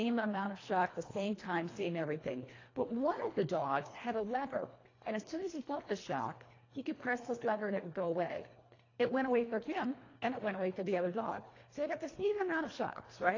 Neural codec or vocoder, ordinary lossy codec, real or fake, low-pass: codec, 24 kHz, 1.5 kbps, HILCodec; AAC, 32 kbps; fake; 7.2 kHz